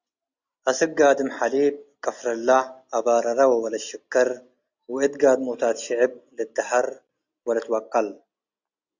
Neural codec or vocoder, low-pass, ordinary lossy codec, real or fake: none; 7.2 kHz; Opus, 64 kbps; real